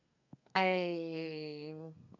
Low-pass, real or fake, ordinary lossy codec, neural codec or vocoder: 7.2 kHz; fake; none; codec, 32 kHz, 1.9 kbps, SNAC